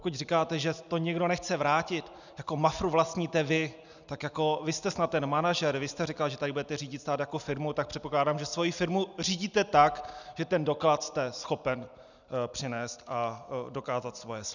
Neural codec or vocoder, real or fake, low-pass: none; real; 7.2 kHz